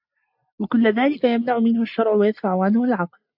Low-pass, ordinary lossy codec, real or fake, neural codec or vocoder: 5.4 kHz; AAC, 48 kbps; real; none